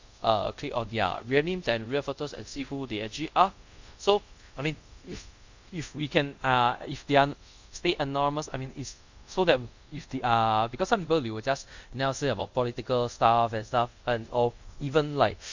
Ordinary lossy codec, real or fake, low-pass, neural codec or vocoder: Opus, 64 kbps; fake; 7.2 kHz; codec, 24 kHz, 0.5 kbps, DualCodec